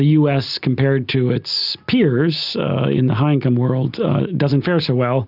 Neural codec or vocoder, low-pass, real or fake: none; 5.4 kHz; real